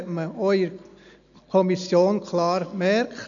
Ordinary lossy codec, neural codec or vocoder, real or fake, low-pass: none; none; real; 7.2 kHz